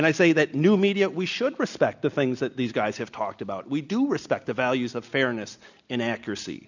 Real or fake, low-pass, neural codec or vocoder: real; 7.2 kHz; none